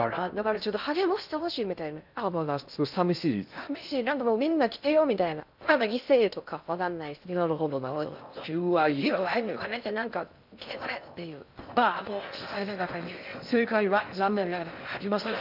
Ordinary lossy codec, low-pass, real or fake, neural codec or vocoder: none; 5.4 kHz; fake; codec, 16 kHz in and 24 kHz out, 0.6 kbps, FocalCodec, streaming, 2048 codes